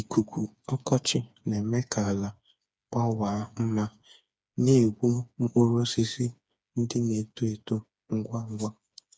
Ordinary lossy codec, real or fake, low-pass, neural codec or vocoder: none; fake; none; codec, 16 kHz, 4 kbps, FreqCodec, smaller model